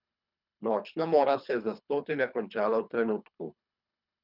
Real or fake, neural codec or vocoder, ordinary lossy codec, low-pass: fake; codec, 24 kHz, 3 kbps, HILCodec; none; 5.4 kHz